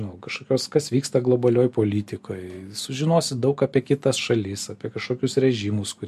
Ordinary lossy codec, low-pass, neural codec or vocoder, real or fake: MP3, 64 kbps; 14.4 kHz; none; real